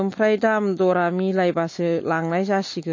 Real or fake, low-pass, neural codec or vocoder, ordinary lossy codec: real; 7.2 kHz; none; MP3, 32 kbps